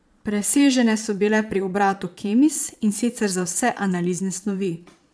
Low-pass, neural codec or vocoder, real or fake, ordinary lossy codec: none; vocoder, 22.05 kHz, 80 mel bands, Vocos; fake; none